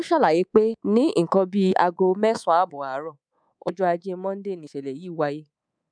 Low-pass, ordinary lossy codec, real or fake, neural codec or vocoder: 9.9 kHz; none; fake; codec, 24 kHz, 3.1 kbps, DualCodec